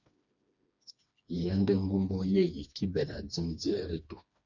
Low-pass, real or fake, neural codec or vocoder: 7.2 kHz; fake; codec, 16 kHz, 2 kbps, FreqCodec, smaller model